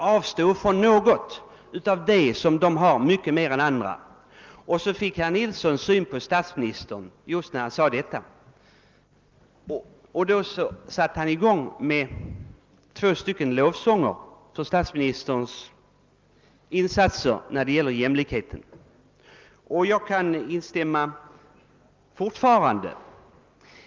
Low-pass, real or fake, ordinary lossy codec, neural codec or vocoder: 7.2 kHz; real; Opus, 32 kbps; none